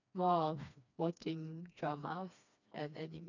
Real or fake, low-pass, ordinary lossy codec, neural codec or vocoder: fake; 7.2 kHz; none; codec, 16 kHz, 2 kbps, FreqCodec, smaller model